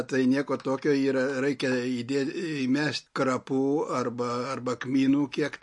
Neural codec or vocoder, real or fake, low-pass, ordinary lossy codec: none; real; 10.8 kHz; MP3, 48 kbps